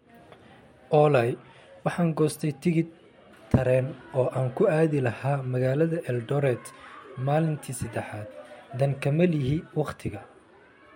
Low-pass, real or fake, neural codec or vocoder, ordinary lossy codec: 19.8 kHz; real; none; MP3, 64 kbps